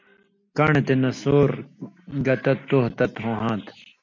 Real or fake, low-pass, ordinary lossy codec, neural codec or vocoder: real; 7.2 kHz; MP3, 64 kbps; none